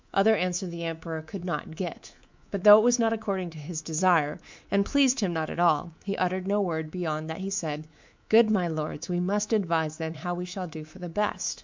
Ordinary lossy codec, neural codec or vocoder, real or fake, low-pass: MP3, 64 kbps; codec, 24 kHz, 3.1 kbps, DualCodec; fake; 7.2 kHz